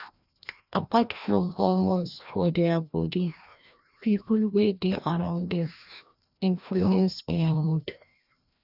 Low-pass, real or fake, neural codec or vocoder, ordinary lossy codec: 5.4 kHz; fake; codec, 16 kHz, 1 kbps, FreqCodec, larger model; none